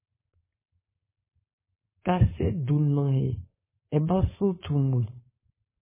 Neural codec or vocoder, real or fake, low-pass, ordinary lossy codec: codec, 16 kHz, 4.8 kbps, FACodec; fake; 3.6 kHz; MP3, 16 kbps